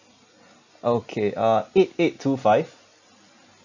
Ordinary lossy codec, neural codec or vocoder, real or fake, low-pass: none; none; real; 7.2 kHz